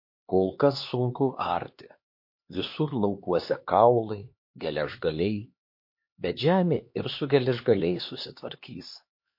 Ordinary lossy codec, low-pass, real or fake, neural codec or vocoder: MP3, 32 kbps; 5.4 kHz; fake; codec, 16 kHz, 2 kbps, X-Codec, HuBERT features, trained on LibriSpeech